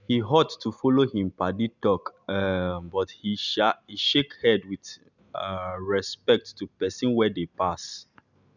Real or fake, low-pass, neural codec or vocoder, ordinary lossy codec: real; 7.2 kHz; none; none